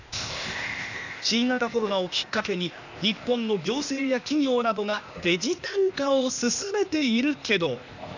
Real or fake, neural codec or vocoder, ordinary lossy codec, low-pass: fake; codec, 16 kHz, 0.8 kbps, ZipCodec; none; 7.2 kHz